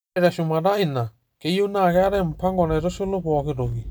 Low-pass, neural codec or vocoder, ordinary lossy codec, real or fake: none; none; none; real